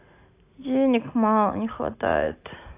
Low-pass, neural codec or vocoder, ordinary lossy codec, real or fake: 3.6 kHz; none; AAC, 24 kbps; real